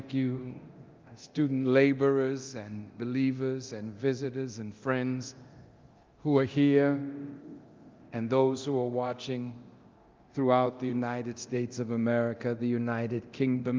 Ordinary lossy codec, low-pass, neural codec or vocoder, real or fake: Opus, 32 kbps; 7.2 kHz; codec, 24 kHz, 0.9 kbps, DualCodec; fake